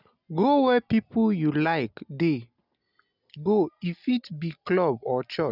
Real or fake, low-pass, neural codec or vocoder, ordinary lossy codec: real; 5.4 kHz; none; none